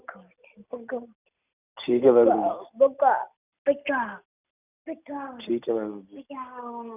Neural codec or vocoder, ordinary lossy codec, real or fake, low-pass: vocoder, 44.1 kHz, 128 mel bands every 256 samples, BigVGAN v2; none; fake; 3.6 kHz